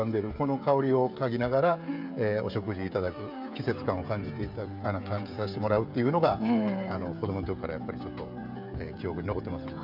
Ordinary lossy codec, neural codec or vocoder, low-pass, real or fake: none; codec, 16 kHz, 16 kbps, FreqCodec, smaller model; 5.4 kHz; fake